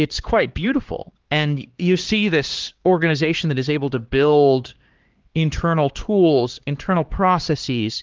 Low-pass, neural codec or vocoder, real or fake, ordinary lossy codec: 7.2 kHz; codec, 16 kHz, 2 kbps, X-Codec, HuBERT features, trained on LibriSpeech; fake; Opus, 16 kbps